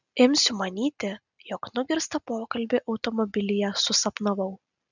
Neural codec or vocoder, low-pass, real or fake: none; 7.2 kHz; real